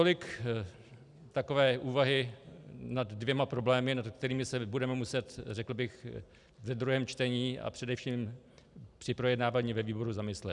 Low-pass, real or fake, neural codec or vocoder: 10.8 kHz; real; none